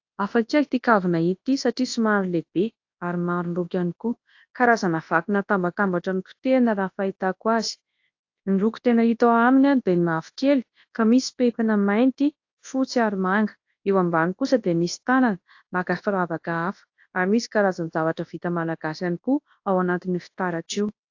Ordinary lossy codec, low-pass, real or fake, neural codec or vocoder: AAC, 48 kbps; 7.2 kHz; fake; codec, 24 kHz, 0.9 kbps, WavTokenizer, large speech release